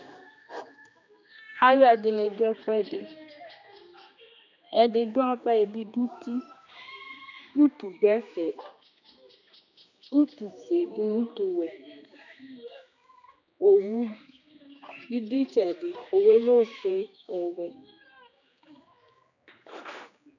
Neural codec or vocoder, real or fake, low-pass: codec, 16 kHz, 2 kbps, X-Codec, HuBERT features, trained on general audio; fake; 7.2 kHz